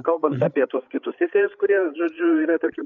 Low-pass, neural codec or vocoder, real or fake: 7.2 kHz; codec, 16 kHz, 8 kbps, FreqCodec, larger model; fake